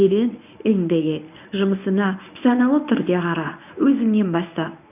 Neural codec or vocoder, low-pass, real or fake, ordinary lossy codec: codec, 24 kHz, 0.9 kbps, WavTokenizer, medium speech release version 1; 3.6 kHz; fake; none